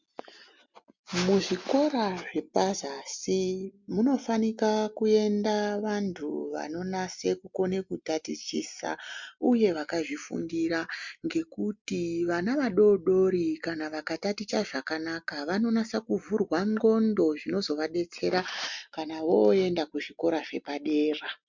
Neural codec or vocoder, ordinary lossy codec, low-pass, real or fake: none; MP3, 64 kbps; 7.2 kHz; real